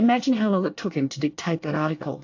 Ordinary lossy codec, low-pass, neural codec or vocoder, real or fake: AAC, 48 kbps; 7.2 kHz; codec, 24 kHz, 1 kbps, SNAC; fake